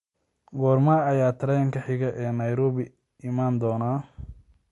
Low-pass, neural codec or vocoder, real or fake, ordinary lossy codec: 14.4 kHz; none; real; MP3, 48 kbps